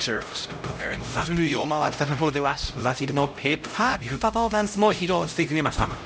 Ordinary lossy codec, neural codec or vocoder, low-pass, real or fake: none; codec, 16 kHz, 0.5 kbps, X-Codec, HuBERT features, trained on LibriSpeech; none; fake